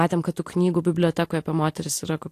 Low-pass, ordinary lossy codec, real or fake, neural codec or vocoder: 14.4 kHz; AAC, 64 kbps; real; none